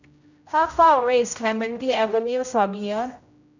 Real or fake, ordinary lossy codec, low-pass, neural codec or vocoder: fake; none; 7.2 kHz; codec, 16 kHz, 0.5 kbps, X-Codec, HuBERT features, trained on general audio